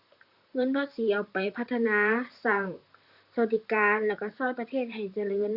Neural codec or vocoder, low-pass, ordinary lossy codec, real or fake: vocoder, 44.1 kHz, 128 mel bands, Pupu-Vocoder; 5.4 kHz; Opus, 64 kbps; fake